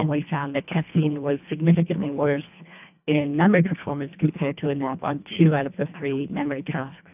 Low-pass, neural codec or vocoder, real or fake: 3.6 kHz; codec, 24 kHz, 1.5 kbps, HILCodec; fake